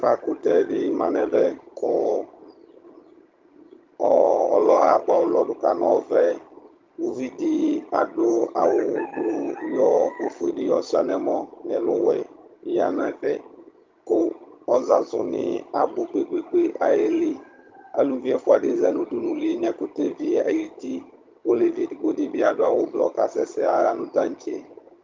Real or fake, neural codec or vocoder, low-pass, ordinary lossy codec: fake; vocoder, 22.05 kHz, 80 mel bands, HiFi-GAN; 7.2 kHz; Opus, 24 kbps